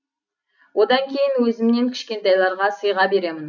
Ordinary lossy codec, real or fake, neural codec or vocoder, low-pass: none; real; none; 7.2 kHz